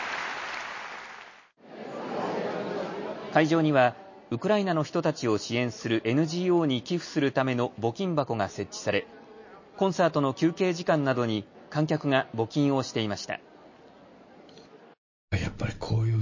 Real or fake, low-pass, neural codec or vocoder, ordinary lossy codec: real; 7.2 kHz; none; MP3, 32 kbps